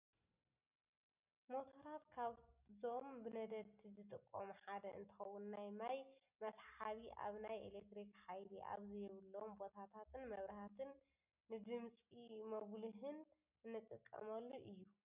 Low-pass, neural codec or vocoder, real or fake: 3.6 kHz; none; real